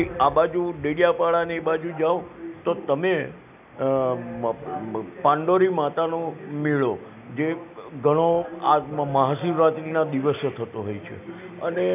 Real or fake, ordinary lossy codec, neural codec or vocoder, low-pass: real; none; none; 3.6 kHz